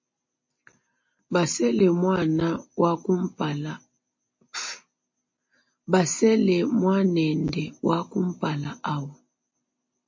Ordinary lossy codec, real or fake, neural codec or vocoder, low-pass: MP3, 32 kbps; real; none; 7.2 kHz